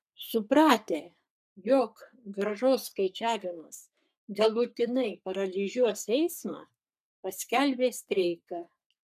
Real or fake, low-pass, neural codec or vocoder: fake; 14.4 kHz; codec, 44.1 kHz, 3.4 kbps, Pupu-Codec